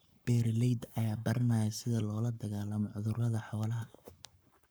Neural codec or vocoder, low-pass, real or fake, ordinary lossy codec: codec, 44.1 kHz, 7.8 kbps, Pupu-Codec; none; fake; none